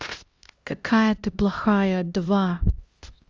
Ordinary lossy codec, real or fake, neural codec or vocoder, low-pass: Opus, 32 kbps; fake; codec, 16 kHz, 1 kbps, X-Codec, HuBERT features, trained on LibriSpeech; 7.2 kHz